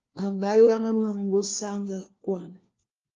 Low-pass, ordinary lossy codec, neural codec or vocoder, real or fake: 7.2 kHz; Opus, 32 kbps; codec, 16 kHz, 1 kbps, FunCodec, trained on LibriTTS, 50 frames a second; fake